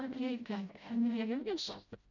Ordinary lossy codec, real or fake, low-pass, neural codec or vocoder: none; fake; 7.2 kHz; codec, 16 kHz, 0.5 kbps, FreqCodec, smaller model